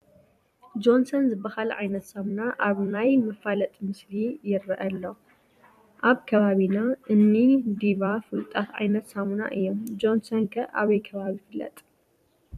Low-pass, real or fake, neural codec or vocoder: 14.4 kHz; fake; vocoder, 44.1 kHz, 128 mel bands every 256 samples, BigVGAN v2